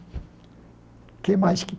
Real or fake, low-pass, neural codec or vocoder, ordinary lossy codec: real; none; none; none